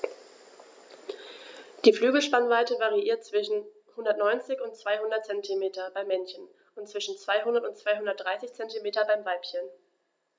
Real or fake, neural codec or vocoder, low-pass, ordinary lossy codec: real; none; none; none